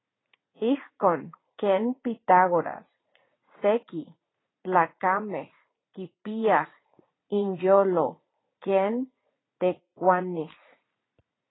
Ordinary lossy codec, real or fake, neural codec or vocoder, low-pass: AAC, 16 kbps; fake; vocoder, 44.1 kHz, 80 mel bands, Vocos; 7.2 kHz